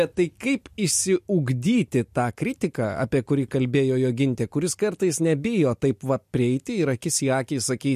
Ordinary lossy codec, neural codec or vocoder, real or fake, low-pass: MP3, 64 kbps; none; real; 14.4 kHz